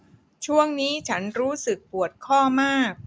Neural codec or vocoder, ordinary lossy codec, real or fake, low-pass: none; none; real; none